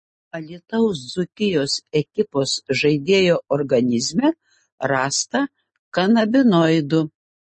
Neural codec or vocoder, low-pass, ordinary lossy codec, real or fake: none; 10.8 kHz; MP3, 32 kbps; real